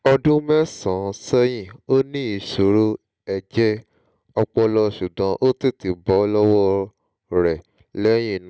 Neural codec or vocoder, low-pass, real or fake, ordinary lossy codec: none; none; real; none